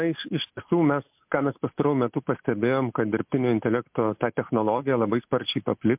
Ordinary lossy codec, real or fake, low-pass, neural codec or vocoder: MP3, 32 kbps; real; 3.6 kHz; none